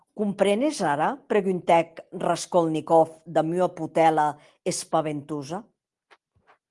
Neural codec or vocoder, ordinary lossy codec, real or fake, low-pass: none; Opus, 24 kbps; real; 10.8 kHz